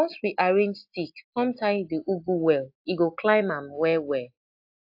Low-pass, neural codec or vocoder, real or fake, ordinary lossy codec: 5.4 kHz; none; real; AAC, 48 kbps